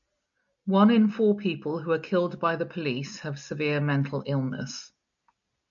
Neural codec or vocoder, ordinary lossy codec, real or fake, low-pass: none; MP3, 96 kbps; real; 7.2 kHz